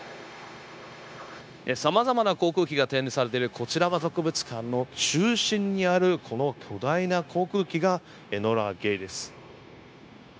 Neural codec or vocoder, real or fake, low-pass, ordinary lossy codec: codec, 16 kHz, 0.9 kbps, LongCat-Audio-Codec; fake; none; none